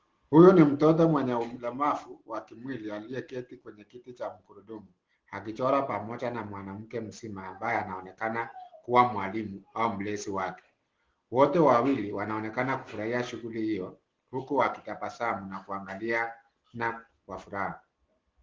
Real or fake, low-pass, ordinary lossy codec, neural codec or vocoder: real; 7.2 kHz; Opus, 16 kbps; none